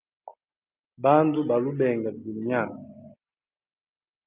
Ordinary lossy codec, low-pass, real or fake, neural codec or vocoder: Opus, 24 kbps; 3.6 kHz; real; none